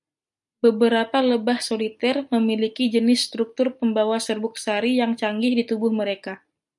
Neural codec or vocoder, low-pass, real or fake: none; 10.8 kHz; real